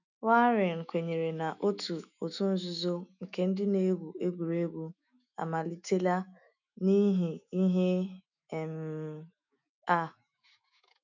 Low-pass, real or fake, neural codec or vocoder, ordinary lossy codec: 7.2 kHz; fake; autoencoder, 48 kHz, 128 numbers a frame, DAC-VAE, trained on Japanese speech; none